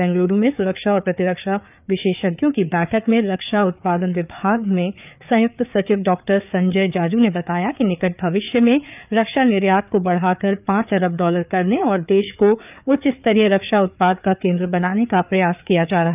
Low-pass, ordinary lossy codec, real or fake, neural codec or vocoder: 3.6 kHz; none; fake; codec, 16 kHz, 4 kbps, FreqCodec, larger model